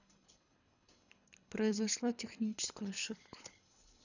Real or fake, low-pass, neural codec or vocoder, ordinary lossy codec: fake; 7.2 kHz; codec, 24 kHz, 3 kbps, HILCodec; none